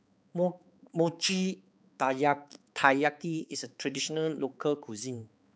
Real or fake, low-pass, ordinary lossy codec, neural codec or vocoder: fake; none; none; codec, 16 kHz, 4 kbps, X-Codec, HuBERT features, trained on balanced general audio